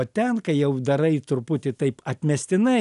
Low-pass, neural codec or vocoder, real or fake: 10.8 kHz; none; real